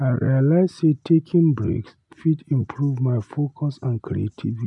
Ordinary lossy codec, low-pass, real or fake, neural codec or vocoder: none; 10.8 kHz; real; none